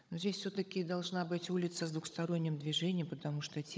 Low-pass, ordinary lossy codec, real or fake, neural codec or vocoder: none; none; fake; codec, 16 kHz, 16 kbps, FunCodec, trained on Chinese and English, 50 frames a second